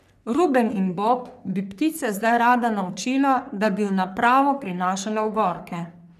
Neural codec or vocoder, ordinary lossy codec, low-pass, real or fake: codec, 44.1 kHz, 3.4 kbps, Pupu-Codec; none; 14.4 kHz; fake